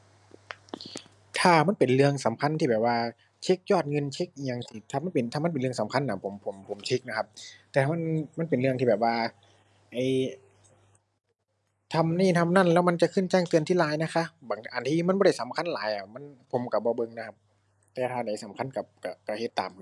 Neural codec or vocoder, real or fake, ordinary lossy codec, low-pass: none; real; none; none